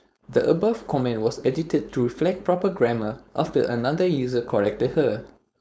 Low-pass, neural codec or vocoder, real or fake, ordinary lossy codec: none; codec, 16 kHz, 4.8 kbps, FACodec; fake; none